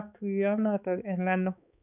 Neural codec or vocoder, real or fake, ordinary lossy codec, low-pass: codec, 16 kHz, 4 kbps, X-Codec, HuBERT features, trained on balanced general audio; fake; none; 3.6 kHz